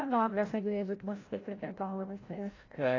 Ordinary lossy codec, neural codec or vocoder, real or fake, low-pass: AAC, 32 kbps; codec, 16 kHz, 0.5 kbps, FreqCodec, larger model; fake; 7.2 kHz